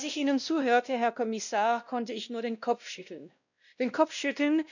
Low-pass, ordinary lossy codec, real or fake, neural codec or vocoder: 7.2 kHz; none; fake; codec, 16 kHz, 1 kbps, X-Codec, WavLM features, trained on Multilingual LibriSpeech